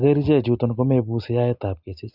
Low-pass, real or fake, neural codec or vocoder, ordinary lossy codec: 5.4 kHz; real; none; Opus, 64 kbps